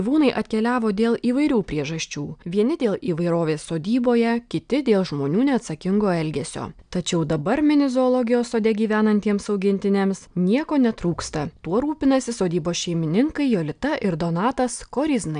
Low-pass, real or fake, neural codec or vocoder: 9.9 kHz; real; none